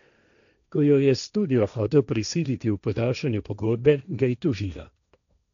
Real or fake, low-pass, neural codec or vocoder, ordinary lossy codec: fake; 7.2 kHz; codec, 16 kHz, 1.1 kbps, Voila-Tokenizer; none